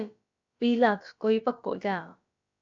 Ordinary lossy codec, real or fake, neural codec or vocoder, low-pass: AAC, 64 kbps; fake; codec, 16 kHz, about 1 kbps, DyCAST, with the encoder's durations; 7.2 kHz